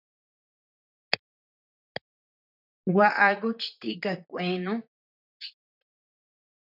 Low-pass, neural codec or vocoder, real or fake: 5.4 kHz; vocoder, 44.1 kHz, 128 mel bands, Pupu-Vocoder; fake